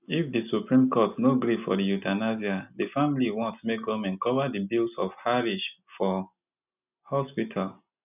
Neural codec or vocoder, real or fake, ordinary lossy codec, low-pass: none; real; none; 3.6 kHz